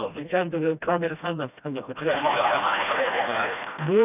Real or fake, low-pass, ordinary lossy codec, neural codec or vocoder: fake; 3.6 kHz; none; codec, 16 kHz, 1 kbps, FreqCodec, smaller model